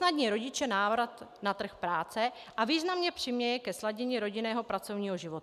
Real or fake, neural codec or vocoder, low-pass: real; none; 14.4 kHz